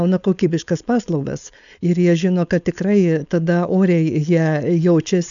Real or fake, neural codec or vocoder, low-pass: fake; codec, 16 kHz, 4.8 kbps, FACodec; 7.2 kHz